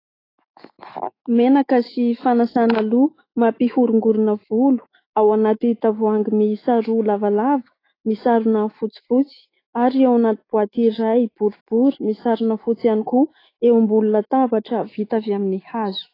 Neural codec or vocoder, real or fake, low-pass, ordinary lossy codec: autoencoder, 48 kHz, 128 numbers a frame, DAC-VAE, trained on Japanese speech; fake; 5.4 kHz; AAC, 24 kbps